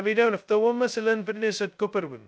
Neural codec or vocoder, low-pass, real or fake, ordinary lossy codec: codec, 16 kHz, 0.2 kbps, FocalCodec; none; fake; none